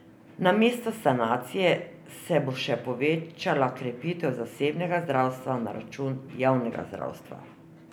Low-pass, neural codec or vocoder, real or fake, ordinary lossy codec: none; none; real; none